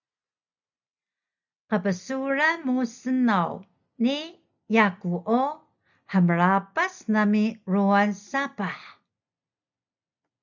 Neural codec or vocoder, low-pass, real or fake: none; 7.2 kHz; real